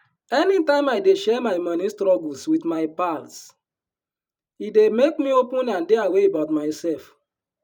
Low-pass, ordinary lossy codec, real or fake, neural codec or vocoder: 19.8 kHz; none; fake; vocoder, 44.1 kHz, 128 mel bands every 512 samples, BigVGAN v2